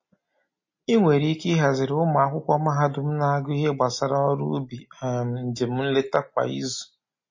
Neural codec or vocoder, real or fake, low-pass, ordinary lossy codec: none; real; 7.2 kHz; MP3, 32 kbps